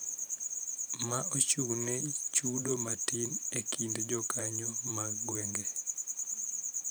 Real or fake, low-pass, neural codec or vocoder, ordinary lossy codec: fake; none; vocoder, 44.1 kHz, 128 mel bands every 512 samples, BigVGAN v2; none